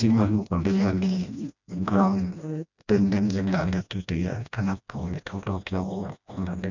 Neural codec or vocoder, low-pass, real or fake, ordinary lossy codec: codec, 16 kHz, 1 kbps, FreqCodec, smaller model; 7.2 kHz; fake; none